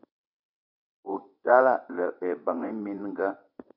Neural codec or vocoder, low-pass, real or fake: vocoder, 22.05 kHz, 80 mel bands, WaveNeXt; 5.4 kHz; fake